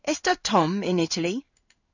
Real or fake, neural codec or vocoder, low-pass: fake; codec, 16 kHz in and 24 kHz out, 1 kbps, XY-Tokenizer; 7.2 kHz